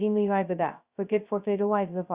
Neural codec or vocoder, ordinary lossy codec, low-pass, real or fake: codec, 16 kHz, 0.2 kbps, FocalCodec; Opus, 64 kbps; 3.6 kHz; fake